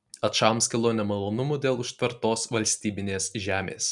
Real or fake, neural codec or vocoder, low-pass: real; none; 10.8 kHz